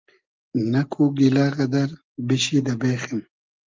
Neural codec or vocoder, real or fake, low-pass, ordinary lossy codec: none; real; 7.2 kHz; Opus, 24 kbps